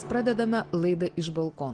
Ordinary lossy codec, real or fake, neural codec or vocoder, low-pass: Opus, 16 kbps; real; none; 9.9 kHz